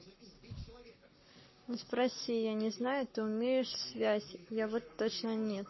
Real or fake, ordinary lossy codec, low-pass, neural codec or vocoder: fake; MP3, 24 kbps; 7.2 kHz; codec, 44.1 kHz, 7.8 kbps, Pupu-Codec